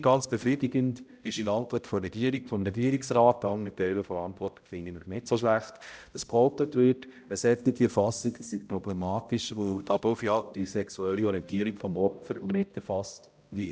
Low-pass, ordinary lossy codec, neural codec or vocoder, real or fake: none; none; codec, 16 kHz, 0.5 kbps, X-Codec, HuBERT features, trained on balanced general audio; fake